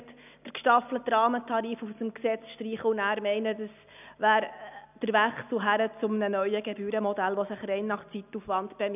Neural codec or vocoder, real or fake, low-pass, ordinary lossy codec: none; real; 3.6 kHz; none